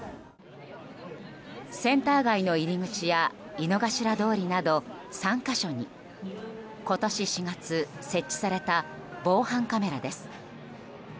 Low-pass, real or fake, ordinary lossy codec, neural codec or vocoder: none; real; none; none